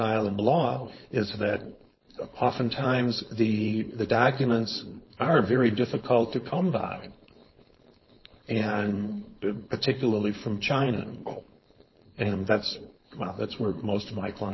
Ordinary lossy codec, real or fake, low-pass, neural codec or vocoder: MP3, 24 kbps; fake; 7.2 kHz; codec, 16 kHz, 4.8 kbps, FACodec